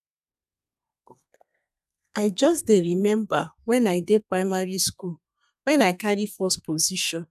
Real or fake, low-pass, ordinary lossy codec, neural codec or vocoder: fake; 14.4 kHz; none; codec, 32 kHz, 1.9 kbps, SNAC